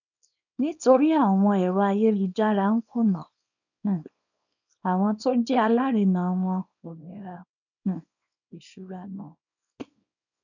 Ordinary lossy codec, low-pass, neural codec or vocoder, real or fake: none; 7.2 kHz; codec, 24 kHz, 0.9 kbps, WavTokenizer, small release; fake